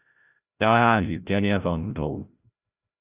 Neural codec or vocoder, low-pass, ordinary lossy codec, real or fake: codec, 16 kHz, 0.5 kbps, FreqCodec, larger model; 3.6 kHz; Opus, 64 kbps; fake